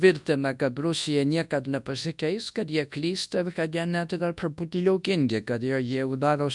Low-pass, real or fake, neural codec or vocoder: 10.8 kHz; fake; codec, 24 kHz, 0.9 kbps, WavTokenizer, large speech release